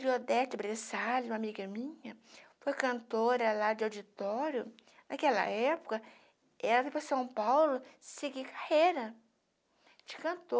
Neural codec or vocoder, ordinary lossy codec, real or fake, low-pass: none; none; real; none